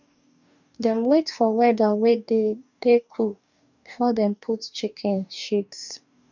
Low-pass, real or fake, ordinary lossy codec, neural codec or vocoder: 7.2 kHz; fake; none; codec, 44.1 kHz, 2.6 kbps, DAC